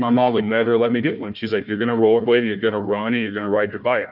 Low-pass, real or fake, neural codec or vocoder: 5.4 kHz; fake; codec, 16 kHz, 1 kbps, FunCodec, trained on Chinese and English, 50 frames a second